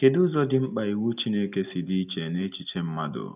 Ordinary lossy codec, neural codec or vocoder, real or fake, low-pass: none; none; real; 3.6 kHz